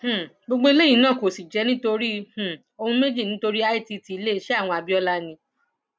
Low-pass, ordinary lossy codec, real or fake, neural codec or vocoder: none; none; real; none